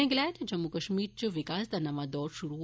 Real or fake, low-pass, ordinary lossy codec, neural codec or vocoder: real; none; none; none